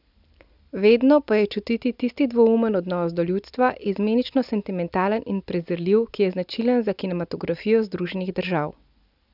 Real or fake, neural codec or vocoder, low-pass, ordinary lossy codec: real; none; 5.4 kHz; none